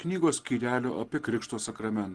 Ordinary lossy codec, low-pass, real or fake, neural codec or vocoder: Opus, 16 kbps; 10.8 kHz; real; none